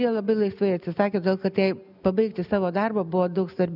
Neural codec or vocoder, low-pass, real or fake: none; 5.4 kHz; real